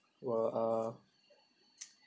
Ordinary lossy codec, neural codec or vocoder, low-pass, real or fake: none; none; none; real